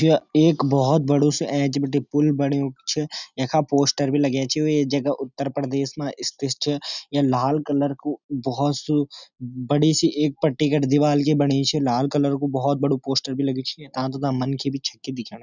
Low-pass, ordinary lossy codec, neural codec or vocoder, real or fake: 7.2 kHz; none; none; real